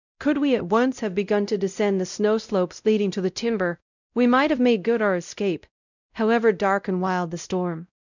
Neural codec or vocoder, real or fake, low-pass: codec, 16 kHz, 0.5 kbps, X-Codec, WavLM features, trained on Multilingual LibriSpeech; fake; 7.2 kHz